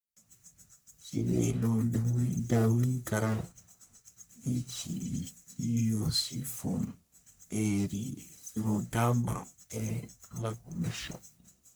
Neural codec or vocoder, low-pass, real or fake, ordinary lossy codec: codec, 44.1 kHz, 1.7 kbps, Pupu-Codec; none; fake; none